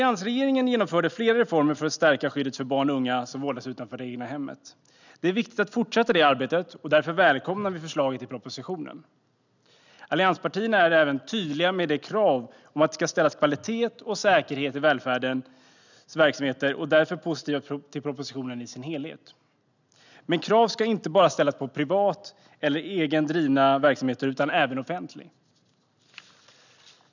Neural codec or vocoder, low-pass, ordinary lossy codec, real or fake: none; 7.2 kHz; none; real